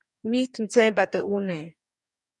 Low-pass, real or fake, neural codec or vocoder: 10.8 kHz; fake; codec, 44.1 kHz, 2.6 kbps, DAC